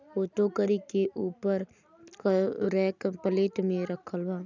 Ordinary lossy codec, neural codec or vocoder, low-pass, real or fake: none; none; 7.2 kHz; real